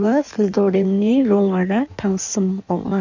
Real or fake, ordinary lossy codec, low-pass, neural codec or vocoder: fake; none; 7.2 kHz; codec, 16 kHz in and 24 kHz out, 1.1 kbps, FireRedTTS-2 codec